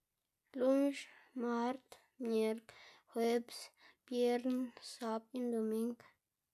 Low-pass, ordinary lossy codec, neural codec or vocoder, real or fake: 14.4 kHz; none; none; real